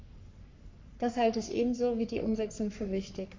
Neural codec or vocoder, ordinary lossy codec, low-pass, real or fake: codec, 44.1 kHz, 3.4 kbps, Pupu-Codec; MP3, 48 kbps; 7.2 kHz; fake